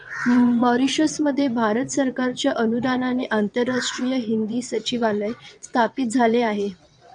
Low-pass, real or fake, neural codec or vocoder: 9.9 kHz; fake; vocoder, 22.05 kHz, 80 mel bands, WaveNeXt